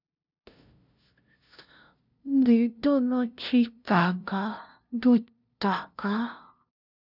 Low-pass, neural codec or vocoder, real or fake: 5.4 kHz; codec, 16 kHz, 0.5 kbps, FunCodec, trained on LibriTTS, 25 frames a second; fake